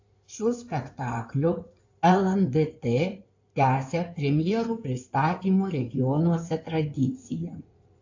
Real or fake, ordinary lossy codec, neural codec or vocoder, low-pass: fake; AAC, 48 kbps; codec, 16 kHz in and 24 kHz out, 2.2 kbps, FireRedTTS-2 codec; 7.2 kHz